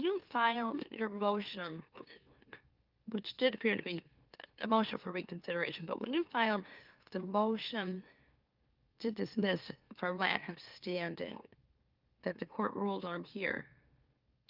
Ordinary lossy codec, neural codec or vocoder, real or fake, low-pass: Opus, 24 kbps; autoencoder, 44.1 kHz, a latent of 192 numbers a frame, MeloTTS; fake; 5.4 kHz